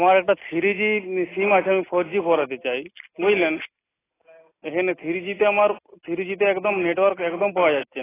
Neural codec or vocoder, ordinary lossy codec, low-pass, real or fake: none; AAC, 16 kbps; 3.6 kHz; real